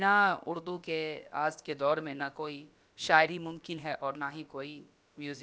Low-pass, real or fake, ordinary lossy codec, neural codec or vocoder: none; fake; none; codec, 16 kHz, about 1 kbps, DyCAST, with the encoder's durations